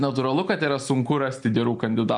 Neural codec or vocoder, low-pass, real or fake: none; 10.8 kHz; real